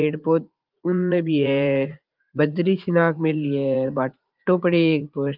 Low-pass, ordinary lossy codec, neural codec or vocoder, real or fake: 5.4 kHz; Opus, 32 kbps; vocoder, 44.1 kHz, 80 mel bands, Vocos; fake